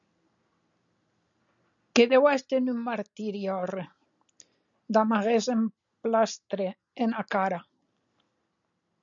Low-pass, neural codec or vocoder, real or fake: 7.2 kHz; none; real